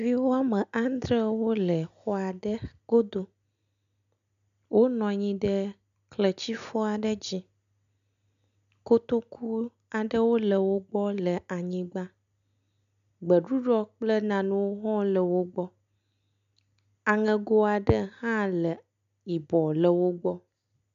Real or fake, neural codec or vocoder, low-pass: real; none; 7.2 kHz